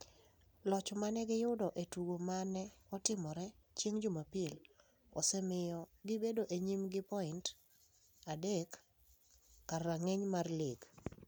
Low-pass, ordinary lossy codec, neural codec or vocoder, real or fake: none; none; none; real